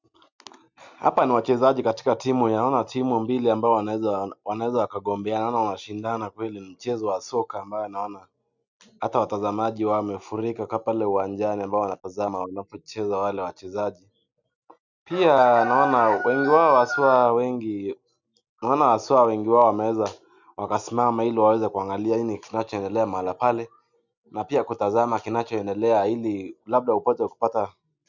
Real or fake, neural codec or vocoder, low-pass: real; none; 7.2 kHz